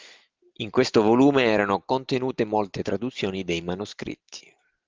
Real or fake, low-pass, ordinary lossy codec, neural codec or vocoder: real; 7.2 kHz; Opus, 32 kbps; none